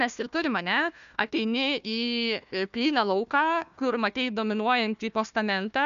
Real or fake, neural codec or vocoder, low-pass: fake; codec, 16 kHz, 1 kbps, FunCodec, trained on Chinese and English, 50 frames a second; 7.2 kHz